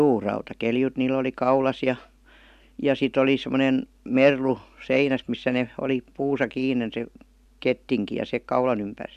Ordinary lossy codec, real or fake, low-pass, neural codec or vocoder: none; real; 14.4 kHz; none